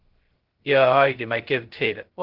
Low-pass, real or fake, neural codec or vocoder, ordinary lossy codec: 5.4 kHz; fake; codec, 16 kHz, 0.2 kbps, FocalCodec; Opus, 16 kbps